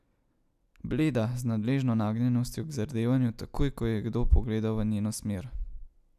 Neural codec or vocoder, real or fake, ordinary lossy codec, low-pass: none; real; none; 14.4 kHz